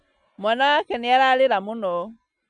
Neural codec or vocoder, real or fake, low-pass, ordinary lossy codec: none; real; 9.9 kHz; MP3, 96 kbps